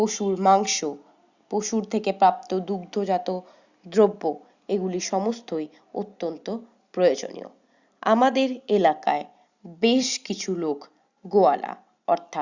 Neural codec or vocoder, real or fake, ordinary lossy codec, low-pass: none; real; Opus, 64 kbps; 7.2 kHz